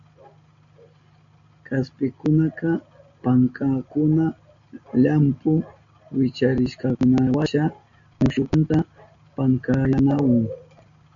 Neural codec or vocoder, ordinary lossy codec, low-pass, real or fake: none; MP3, 96 kbps; 7.2 kHz; real